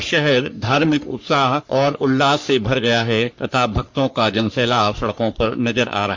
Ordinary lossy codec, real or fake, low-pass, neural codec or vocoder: MP3, 48 kbps; fake; 7.2 kHz; codec, 44.1 kHz, 3.4 kbps, Pupu-Codec